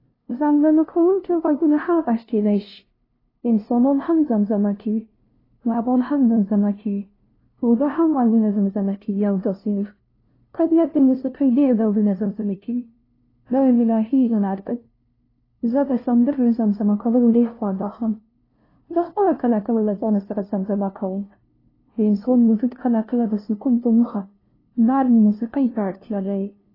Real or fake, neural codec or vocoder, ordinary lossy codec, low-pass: fake; codec, 16 kHz, 0.5 kbps, FunCodec, trained on LibriTTS, 25 frames a second; AAC, 24 kbps; 5.4 kHz